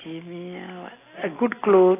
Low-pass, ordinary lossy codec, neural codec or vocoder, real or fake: 3.6 kHz; AAC, 16 kbps; none; real